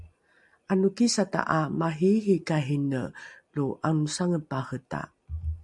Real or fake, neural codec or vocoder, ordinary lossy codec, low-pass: real; none; MP3, 96 kbps; 10.8 kHz